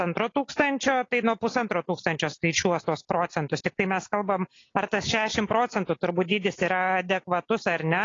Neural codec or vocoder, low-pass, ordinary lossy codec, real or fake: none; 7.2 kHz; AAC, 32 kbps; real